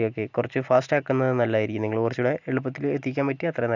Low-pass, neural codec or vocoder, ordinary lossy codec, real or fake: 7.2 kHz; none; none; real